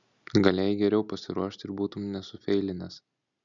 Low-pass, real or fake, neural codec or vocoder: 7.2 kHz; real; none